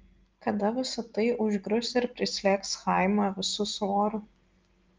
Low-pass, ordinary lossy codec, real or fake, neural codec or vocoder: 7.2 kHz; Opus, 24 kbps; real; none